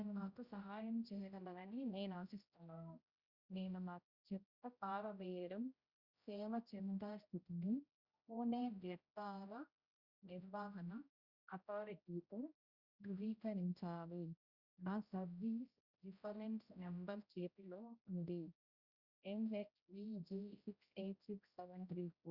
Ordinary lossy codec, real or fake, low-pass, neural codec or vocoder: Opus, 64 kbps; fake; 5.4 kHz; codec, 16 kHz, 0.5 kbps, X-Codec, HuBERT features, trained on general audio